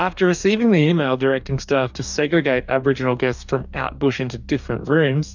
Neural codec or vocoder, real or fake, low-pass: codec, 44.1 kHz, 2.6 kbps, DAC; fake; 7.2 kHz